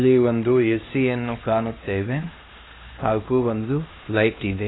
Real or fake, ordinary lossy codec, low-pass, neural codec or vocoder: fake; AAC, 16 kbps; 7.2 kHz; codec, 16 kHz, 1 kbps, X-Codec, HuBERT features, trained on LibriSpeech